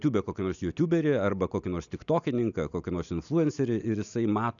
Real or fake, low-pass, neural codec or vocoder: real; 7.2 kHz; none